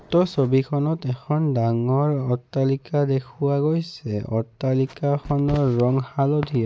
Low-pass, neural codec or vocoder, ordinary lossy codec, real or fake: none; none; none; real